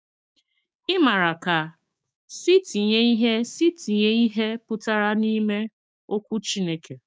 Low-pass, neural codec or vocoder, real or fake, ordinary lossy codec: none; codec, 16 kHz, 6 kbps, DAC; fake; none